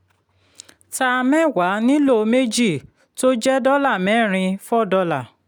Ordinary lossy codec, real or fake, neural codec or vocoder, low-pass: none; real; none; 19.8 kHz